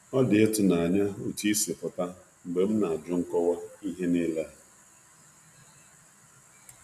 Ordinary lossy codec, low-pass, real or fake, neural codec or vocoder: none; 14.4 kHz; real; none